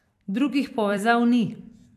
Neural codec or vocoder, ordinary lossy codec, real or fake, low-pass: vocoder, 44.1 kHz, 128 mel bands every 256 samples, BigVGAN v2; none; fake; 14.4 kHz